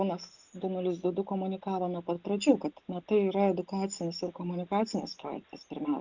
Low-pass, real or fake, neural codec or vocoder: 7.2 kHz; real; none